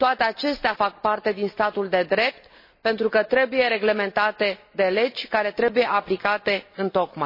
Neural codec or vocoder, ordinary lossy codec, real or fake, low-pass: none; MP3, 24 kbps; real; 5.4 kHz